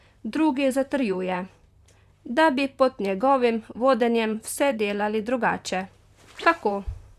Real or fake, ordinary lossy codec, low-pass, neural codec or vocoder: fake; none; 14.4 kHz; vocoder, 44.1 kHz, 128 mel bands, Pupu-Vocoder